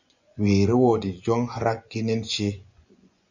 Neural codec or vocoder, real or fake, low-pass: vocoder, 24 kHz, 100 mel bands, Vocos; fake; 7.2 kHz